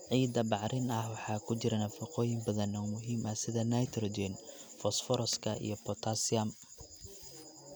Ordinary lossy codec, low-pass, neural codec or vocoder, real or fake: none; none; none; real